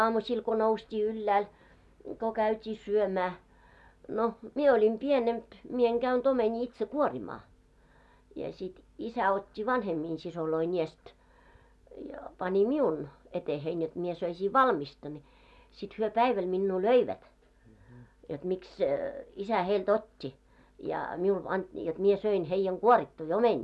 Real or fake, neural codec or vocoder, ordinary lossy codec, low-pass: real; none; none; none